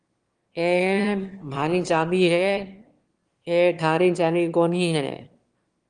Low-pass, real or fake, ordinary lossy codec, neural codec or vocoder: 9.9 kHz; fake; Opus, 24 kbps; autoencoder, 22.05 kHz, a latent of 192 numbers a frame, VITS, trained on one speaker